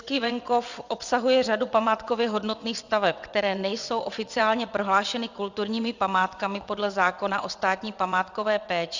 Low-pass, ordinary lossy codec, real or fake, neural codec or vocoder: 7.2 kHz; Opus, 64 kbps; fake; vocoder, 22.05 kHz, 80 mel bands, WaveNeXt